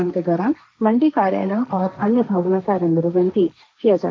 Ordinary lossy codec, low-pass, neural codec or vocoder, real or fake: none; none; codec, 16 kHz, 1.1 kbps, Voila-Tokenizer; fake